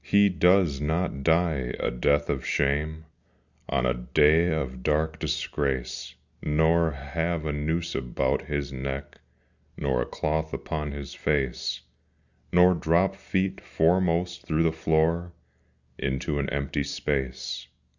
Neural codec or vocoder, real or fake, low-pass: none; real; 7.2 kHz